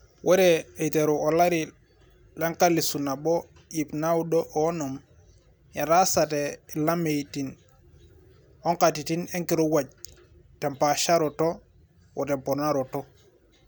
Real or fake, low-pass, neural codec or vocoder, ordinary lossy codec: real; none; none; none